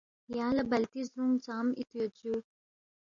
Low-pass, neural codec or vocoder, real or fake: 7.2 kHz; none; real